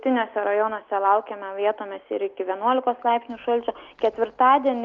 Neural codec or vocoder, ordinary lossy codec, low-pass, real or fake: none; Opus, 32 kbps; 7.2 kHz; real